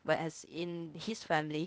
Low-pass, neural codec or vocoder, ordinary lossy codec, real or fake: none; codec, 16 kHz, 0.8 kbps, ZipCodec; none; fake